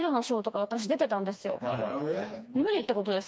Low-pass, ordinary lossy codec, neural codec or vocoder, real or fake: none; none; codec, 16 kHz, 2 kbps, FreqCodec, smaller model; fake